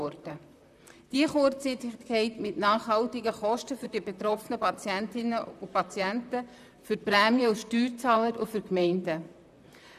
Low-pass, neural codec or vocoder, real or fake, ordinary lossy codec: 14.4 kHz; vocoder, 44.1 kHz, 128 mel bands, Pupu-Vocoder; fake; none